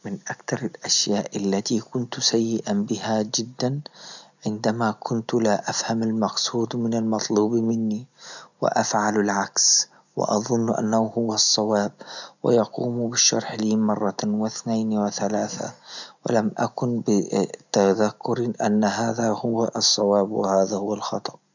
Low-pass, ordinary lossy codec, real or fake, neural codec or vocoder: 7.2 kHz; none; real; none